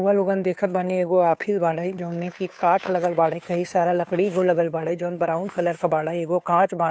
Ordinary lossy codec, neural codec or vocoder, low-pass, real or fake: none; codec, 16 kHz, 2 kbps, FunCodec, trained on Chinese and English, 25 frames a second; none; fake